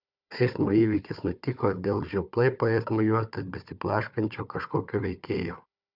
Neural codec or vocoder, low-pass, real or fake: codec, 16 kHz, 4 kbps, FunCodec, trained on Chinese and English, 50 frames a second; 5.4 kHz; fake